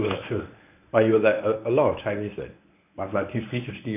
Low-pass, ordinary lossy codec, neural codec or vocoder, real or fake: 3.6 kHz; none; codec, 24 kHz, 0.9 kbps, WavTokenizer, medium speech release version 1; fake